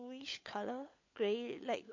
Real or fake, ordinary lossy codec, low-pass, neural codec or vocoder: fake; MP3, 48 kbps; 7.2 kHz; codec, 16 kHz, 16 kbps, FunCodec, trained on LibriTTS, 50 frames a second